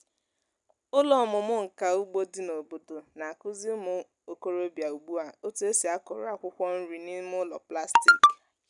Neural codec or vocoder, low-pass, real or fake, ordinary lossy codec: none; 10.8 kHz; real; none